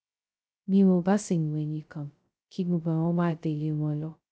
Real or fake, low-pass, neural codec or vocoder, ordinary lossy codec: fake; none; codec, 16 kHz, 0.2 kbps, FocalCodec; none